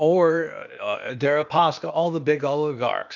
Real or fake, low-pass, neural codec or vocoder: fake; 7.2 kHz; codec, 16 kHz, 0.8 kbps, ZipCodec